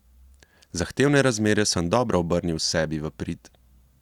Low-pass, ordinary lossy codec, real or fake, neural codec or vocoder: 19.8 kHz; Opus, 64 kbps; real; none